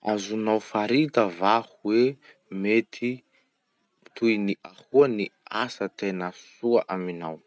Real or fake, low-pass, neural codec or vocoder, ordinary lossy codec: real; none; none; none